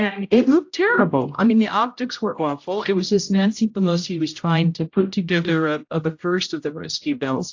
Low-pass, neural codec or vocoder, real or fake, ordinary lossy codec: 7.2 kHz; codec, 16 kHz, 0.5 kbps, X-Codec, HuBERT features, trained on general audio; fake; AAC, 48 kbps